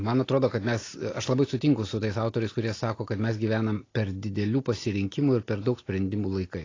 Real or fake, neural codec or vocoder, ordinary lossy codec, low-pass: real; none; AAC, 32 kbps; 7.2 kHz